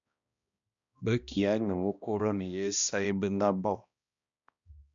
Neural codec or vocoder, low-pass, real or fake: codec, 16 kHz, 1 kbps, X-Codec, HuBERT features, trained on balanced general audio; 7.2 kHz; fake